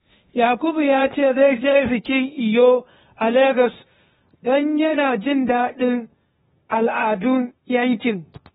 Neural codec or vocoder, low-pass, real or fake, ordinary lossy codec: codec, 16 kHz, 0.8 kbps, ZipCodec; 7.2 kHz; fake; AAC, 16 kbps